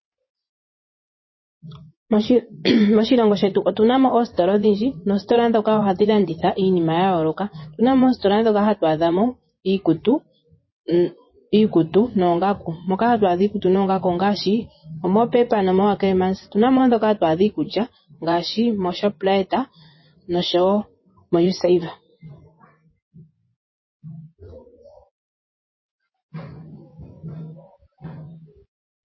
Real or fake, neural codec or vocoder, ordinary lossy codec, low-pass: fake; vocoder, 24 kHz, 100 mel bands, Vocos; MP3, 24 kbps; 7.2 kHz